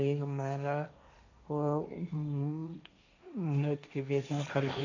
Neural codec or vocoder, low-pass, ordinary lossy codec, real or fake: codec, 16 kHz, 1.1 kbps, Voila-Tokenizer; 7.2 kHz; none; fake